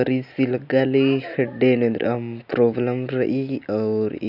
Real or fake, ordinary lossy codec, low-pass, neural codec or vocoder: real; none; 5.4 kHz; none